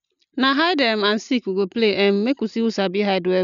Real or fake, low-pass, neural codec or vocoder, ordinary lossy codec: real; 7.2 kHz; none; none